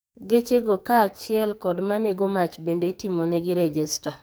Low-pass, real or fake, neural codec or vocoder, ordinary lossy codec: none; fake; codec, 44.1 kHz, 2.6 kbps, SNAC; none